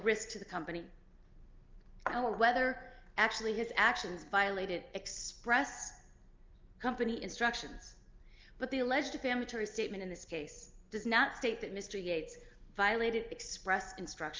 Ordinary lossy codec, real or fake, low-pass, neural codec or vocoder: Opus, 24 kbps; real; 7.2 kHz; none